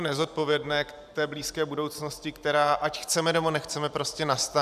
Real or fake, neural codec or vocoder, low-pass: fake; vocoder, 44.1 kHz, 128 mel bands every 512 samples, BigVGAN v2; 14.4 kHz